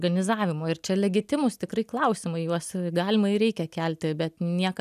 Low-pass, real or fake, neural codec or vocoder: 14.4 kHz; real; none